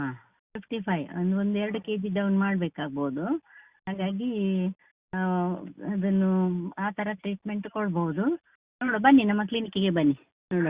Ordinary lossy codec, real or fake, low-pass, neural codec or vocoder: Opus, 64 kbps; real; 3.6 kHz; none